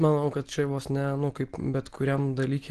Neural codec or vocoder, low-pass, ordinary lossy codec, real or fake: none; 10.8 kHz; Opus, 16 kbps; real